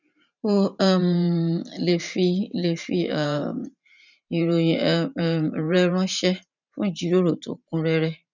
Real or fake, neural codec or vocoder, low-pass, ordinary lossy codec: fake; vocoder, 44.1 kHz, 128 mel bands every 512 samples, BigVGAN v2; 7.2 kHz; none